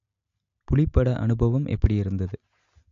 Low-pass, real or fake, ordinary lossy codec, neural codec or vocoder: 7.2 kHz; real; AAC, 64 kbps; none